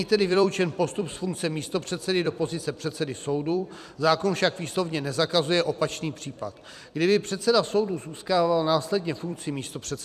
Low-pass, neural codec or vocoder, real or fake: 14.4 kHz; vocoder, 44.1 kHz, 128 mel bands every 256 samples, BigVGAN v2; fake